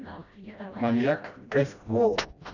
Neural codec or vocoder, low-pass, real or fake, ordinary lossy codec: codec, 16 kHz, 1 kbps, FreqCodec, smaller model; 7.2 kHz; fake; none